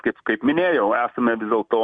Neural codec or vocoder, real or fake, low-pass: none; real; 9.9 kHz